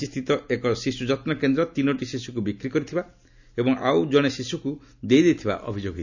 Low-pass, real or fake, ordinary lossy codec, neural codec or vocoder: 7.2 kHz; real; none; none